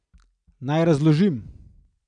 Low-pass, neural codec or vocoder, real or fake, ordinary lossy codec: 9.9 kHz; none; real; none